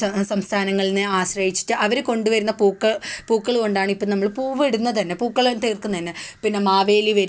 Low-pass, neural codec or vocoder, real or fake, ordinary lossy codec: none; none; real; none